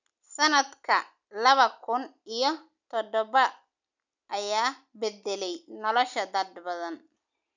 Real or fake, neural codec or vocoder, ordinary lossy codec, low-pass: real; none; none; 7.2 kHz